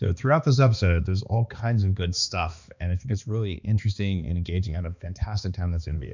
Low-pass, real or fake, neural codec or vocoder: 7.2 kHz; fake; codec, 16 kHz, 2 kbps, X-Codec, HuBERT features, trained on balanced general audio